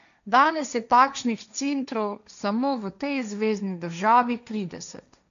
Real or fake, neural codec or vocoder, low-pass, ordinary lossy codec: fake; codec, 16 kHz, 1.1 kbps, Voila-Tokenizer; 7.2 kHz; none